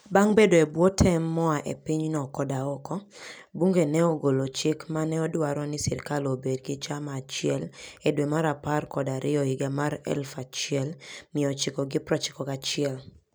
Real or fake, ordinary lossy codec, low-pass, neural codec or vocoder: real; none; none; none